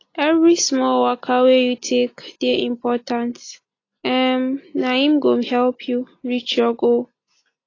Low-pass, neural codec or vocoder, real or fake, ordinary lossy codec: 7.2 kHz; none; real; AAC, 32 kbps